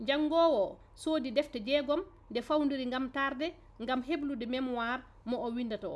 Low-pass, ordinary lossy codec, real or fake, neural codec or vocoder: none; none; real; none